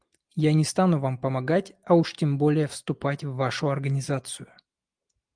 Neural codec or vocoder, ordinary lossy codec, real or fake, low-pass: none; Opus, 24 kbps; real; 9.9 kHz